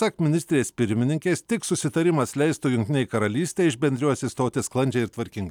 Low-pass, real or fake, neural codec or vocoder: 19.8 kHz; real; none